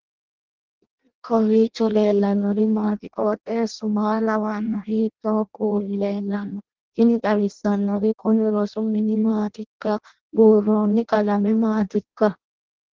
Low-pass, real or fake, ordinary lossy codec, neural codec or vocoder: 7.2 kHz; fake; Opus, 16 kbps; codec, 16 kHz in and 24 kHz out, 0.6 kbps, FireRedTTS-2 codec